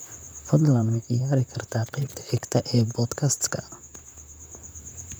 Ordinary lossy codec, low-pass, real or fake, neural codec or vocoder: none; none; fake; vocoder, 44.1 kHz, 128 mel bands, Pupu-Vocoder